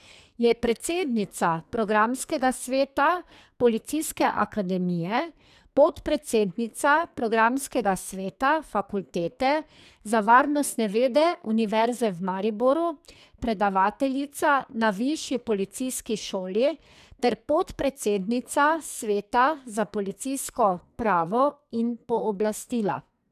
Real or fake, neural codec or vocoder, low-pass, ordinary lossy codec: fake; codec, 44.1 kHz, 2.6 kbps, SNAC; 14.4 kHz; none